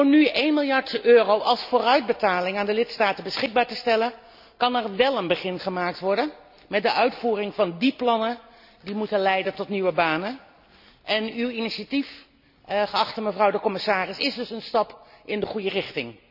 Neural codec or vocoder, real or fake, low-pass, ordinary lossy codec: none; real; 5.4 kHz; none